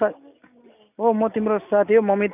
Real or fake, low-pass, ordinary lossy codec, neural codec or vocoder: real; 3.6 kHz; none; none